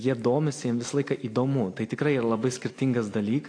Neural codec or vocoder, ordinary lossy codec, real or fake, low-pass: none; AAC, 48 kbps; real; 9.9 kHz